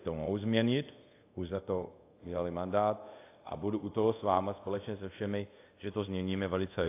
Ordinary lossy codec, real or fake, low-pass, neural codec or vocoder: AAC, 24 kbps; fake; 3.6 kHz; codec, 24 kHz, 0.5 kbps, DualCodec